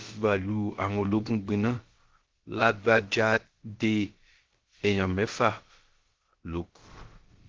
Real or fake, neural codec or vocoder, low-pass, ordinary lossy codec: fake; codec, 16 kHz, about 1 kbps, DyCAST, with the encoder's durations; 7.2 kHz; Opus, 16 kbps